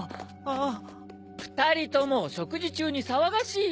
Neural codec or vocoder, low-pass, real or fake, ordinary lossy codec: none; none; real; none